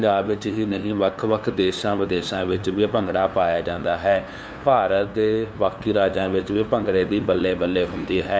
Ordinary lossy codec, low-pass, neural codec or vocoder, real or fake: none; none; codec, 16 kHz, 2 kbps, FunCodec, trained on LibriTTS, 25 frames a second; fake